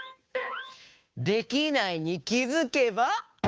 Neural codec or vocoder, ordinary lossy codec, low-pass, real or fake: codec, 16 kHz, 2 kbps, FunCodec, trained on Chinese and English, 25 frames a second; none; none; fake